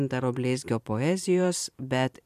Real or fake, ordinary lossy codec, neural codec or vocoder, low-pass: fake; MP3, 96 kbps; vocoder, 44.1 kHz, 128 mel bands every 512 samples, BigVGAN v2; 14.4 kHz